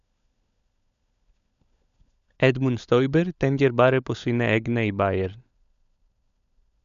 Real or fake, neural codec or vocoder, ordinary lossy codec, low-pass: fake; codec, 16 kHz, 16 kbps, FunCodec, trained on LibriTTS, 50 frames a second; none; 7.2 kHz